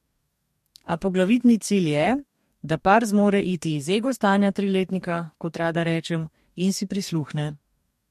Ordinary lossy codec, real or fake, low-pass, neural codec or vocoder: MP3, 64 kbps; fake; 14.4 kHz; codec, 44.1 kHz, 2.6 kbps, DAC